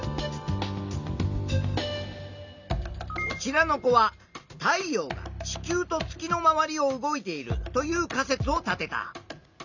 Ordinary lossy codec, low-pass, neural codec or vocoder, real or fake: none; 7.2 kHz; none; real